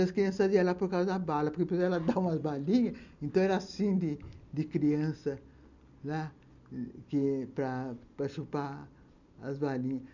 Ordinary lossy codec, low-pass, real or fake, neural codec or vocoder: none; 7.2 kHz; real; none